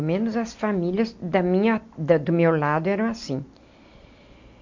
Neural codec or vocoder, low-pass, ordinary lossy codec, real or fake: none; 7.2 kHz; MP3, 48 kbps; real